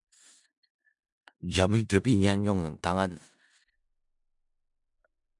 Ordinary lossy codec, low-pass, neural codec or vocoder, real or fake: MP3, 96 kbps; 10.8 kHz; codec, 16 kHz in and 24 kHz out, 0.4 kbps, LongCat-Audio-Codec, four codebook decoder; fake